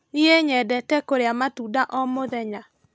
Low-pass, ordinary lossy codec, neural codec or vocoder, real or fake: none; none; none; real